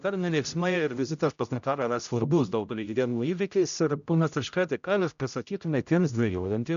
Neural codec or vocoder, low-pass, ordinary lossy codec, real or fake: codec, 16 kHz, 0.5 kbps, X-Codec, HuBERT features, trained on general audio; 7.2 kHz; AAC, 96 kbps; fake